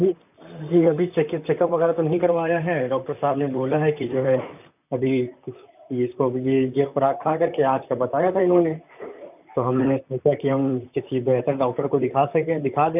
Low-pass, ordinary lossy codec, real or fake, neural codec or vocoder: 3.6 kHz; none; fake; vocoder, 44.1 kHz, 128 mel bands, Pupu-Vocoder